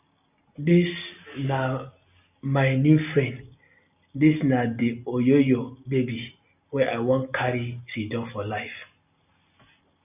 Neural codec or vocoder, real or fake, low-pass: none; real; 3.6 kHz